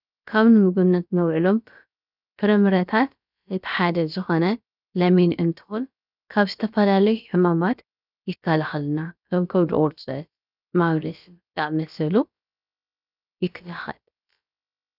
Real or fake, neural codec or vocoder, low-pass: fake; codec, 16 kHz, about 1 kbps, DyCAST, with the encoder's durations; 5.4 kHz